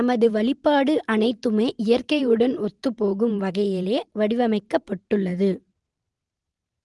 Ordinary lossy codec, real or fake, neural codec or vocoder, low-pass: Opus, 24 kbps; fake; vocoder, 44.1 kHz, 128 mel bands every 512 samples, BigVGAN v2; 10.8 kHz